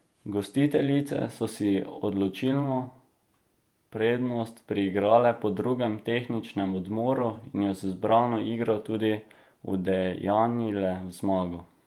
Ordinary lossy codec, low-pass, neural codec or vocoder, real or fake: Opus, 32 kbps; 19.8 kHz; vocoder, 48 kHz, 128 mel bands, Vocos; fake